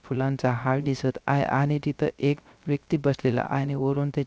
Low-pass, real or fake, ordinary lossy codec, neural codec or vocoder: none; fake; none; codec, 16 kHz, 0.3 kbps, FocalCodec